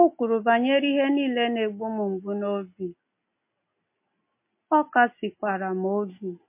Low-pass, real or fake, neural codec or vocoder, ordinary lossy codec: 3.6 kHz; real; none; MP3, 24 kbps